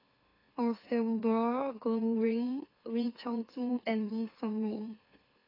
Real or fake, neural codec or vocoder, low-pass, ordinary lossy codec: fake; autoencoder, 44.1 kHz, a latent of 192 numbers a frame, MeloTTS; 5.4 kHz; AAC, 24 kbps